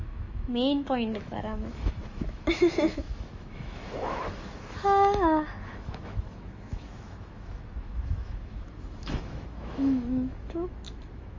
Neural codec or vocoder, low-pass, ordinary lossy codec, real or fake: autoencoder, 48 kHz, 128 numbers a frame, DAC-VAE, trained on Japanese speech; 7.2 kHz; MP3, 32 kbps; fake